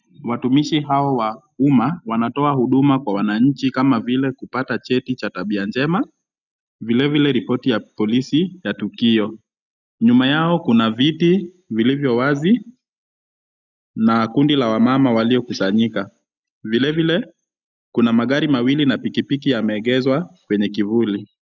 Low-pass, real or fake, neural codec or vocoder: 7.2 kHz; real; none